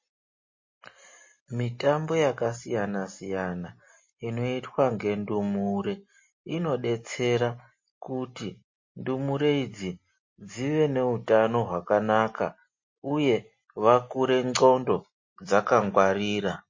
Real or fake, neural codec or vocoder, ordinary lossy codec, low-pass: real; none; MP3, 32 kbps; 7.2 kHz